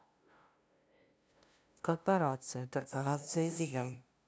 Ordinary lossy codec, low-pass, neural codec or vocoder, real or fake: none; none; codec, 16 kHz, 0.5 kbps, FunCodec, trained on LibriTTS, 25 frames a second; fake